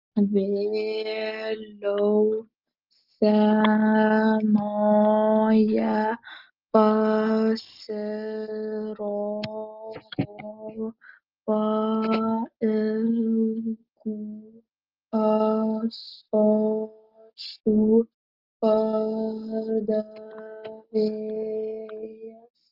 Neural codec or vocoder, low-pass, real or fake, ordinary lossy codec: none; 5.4 kHz; real; Opus, 24 kbps